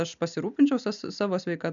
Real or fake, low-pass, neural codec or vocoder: real; 7.2 kHz; none